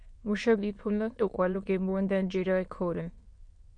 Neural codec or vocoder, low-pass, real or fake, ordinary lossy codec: autoencoder, 22.05 kHz, a latent of 192 numbers a frame, VITS, trained on many speakers; 9.9 kHz; fake; MP3, 48 kbps